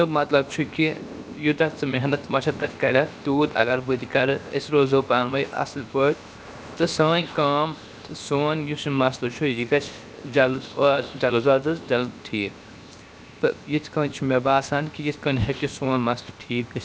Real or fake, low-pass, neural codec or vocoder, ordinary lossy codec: fake; none; codec, 16 kHz, 0.7 kbps, FocalCodec; none